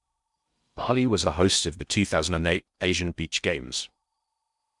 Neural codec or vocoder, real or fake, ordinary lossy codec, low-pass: codec, 16 kHz in and 24 kHz out, 0.6 kbps, FocalCodec, streaming, 2048 codes; fake; none; 10.8 kHz